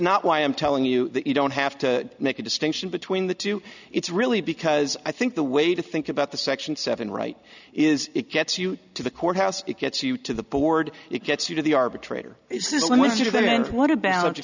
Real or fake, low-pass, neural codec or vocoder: real; 7.2 kHz; none